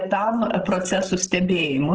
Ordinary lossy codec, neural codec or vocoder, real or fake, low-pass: Opus, 16 kbps; codec, 16 kHz, 16 kbps, FreqCodec, larger model; fake; 7.2 kHz